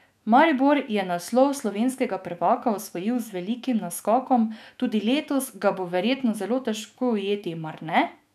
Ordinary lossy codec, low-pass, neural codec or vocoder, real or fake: none; 14.4 kHz; autoencoder, 48 kHz, 128 numbers a frame, DAC-VAE, trained on Japanese speech; fake